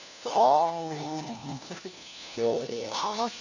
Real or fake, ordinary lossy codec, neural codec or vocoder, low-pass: fake; none; codec, 16 kHz, 1 kbps, FunCodec, trained on LibriTTS, 50 frames a second; 7.2 kHz